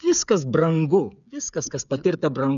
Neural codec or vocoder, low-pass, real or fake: codec, 16 kHz, 8 kbps, FreqCodec, smaller model; 7.2 kHz; fake